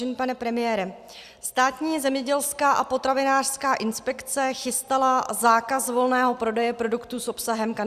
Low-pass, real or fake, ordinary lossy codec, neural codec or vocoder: 14.4 kHz; real; Opus, 64 kbps; none